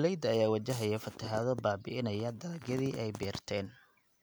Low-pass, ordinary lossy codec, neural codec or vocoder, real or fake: none; none; none; real